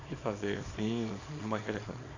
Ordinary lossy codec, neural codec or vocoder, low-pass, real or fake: AAC, 32 kbps; codec, 24 kHz, 0.9 kbps, WavTokenizer, small release; 7.2 kHz; fake